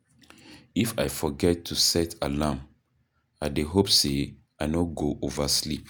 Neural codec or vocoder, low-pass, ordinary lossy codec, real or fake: none; none; none; real